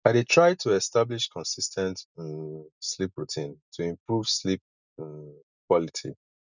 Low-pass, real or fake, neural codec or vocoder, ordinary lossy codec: 7.2 kHz; real; none; none